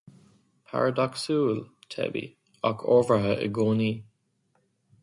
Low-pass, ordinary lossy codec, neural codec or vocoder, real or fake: 10.8 kHz; MP3, 64 kbps; none; real